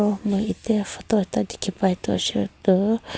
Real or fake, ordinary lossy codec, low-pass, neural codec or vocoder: real; none; none; none